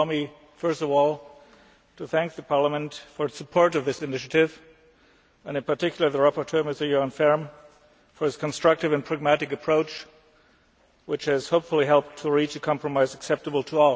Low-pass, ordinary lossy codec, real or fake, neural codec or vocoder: none; none; real; none